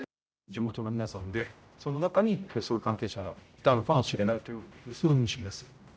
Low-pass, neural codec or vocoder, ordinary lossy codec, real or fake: none; codec, 16 kHz, 0.5 kbps, X-Codec, HuBERT features, trained on general audio; none; fake